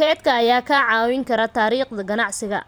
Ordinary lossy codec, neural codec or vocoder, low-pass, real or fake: none; vocoder, 44.1 kHz, 128 mel bands every 512 samples, BigVGAN v2; none; fake